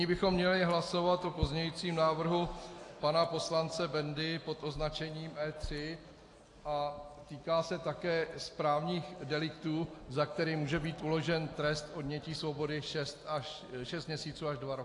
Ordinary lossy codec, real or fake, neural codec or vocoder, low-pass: AAC, 48 kbps; real; none; 10.8 kHz